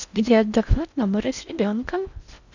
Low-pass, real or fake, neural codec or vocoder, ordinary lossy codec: 7.2 kHz; fake; codec, 16 kHz in and 24 kHz out, 0.6 kbps, FocalCodec, streaming, 4096 codes; none